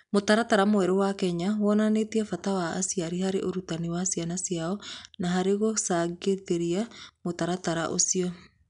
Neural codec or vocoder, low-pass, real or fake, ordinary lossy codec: none; 10.8 kHz; real; none